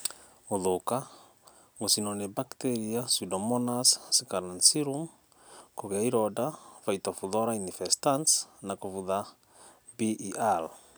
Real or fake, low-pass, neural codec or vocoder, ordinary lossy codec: real; none; none; none